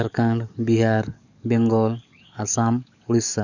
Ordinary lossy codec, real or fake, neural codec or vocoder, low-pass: none; fake; codec, 44.1 kHz, 7.8 kbps, DAC; 7.2 kHz